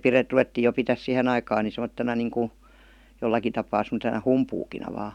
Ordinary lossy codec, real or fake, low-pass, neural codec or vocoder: none; real; 19.8 kHz; none